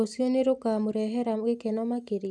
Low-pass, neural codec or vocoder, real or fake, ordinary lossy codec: none; none; real; none